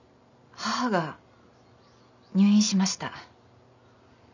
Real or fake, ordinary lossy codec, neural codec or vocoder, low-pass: real; none; none; 7.2 kHz